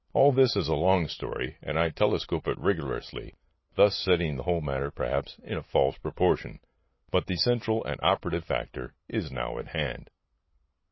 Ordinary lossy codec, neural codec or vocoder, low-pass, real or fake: MP3, 24 kbps; none; 7.2 kHz; real